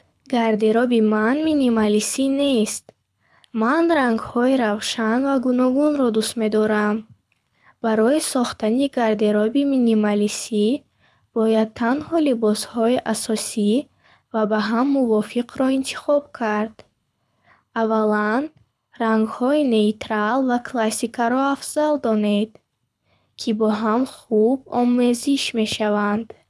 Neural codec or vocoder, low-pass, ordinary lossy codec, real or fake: codec, 24 kHz, 6 kbps, HILCodec; none; none; fake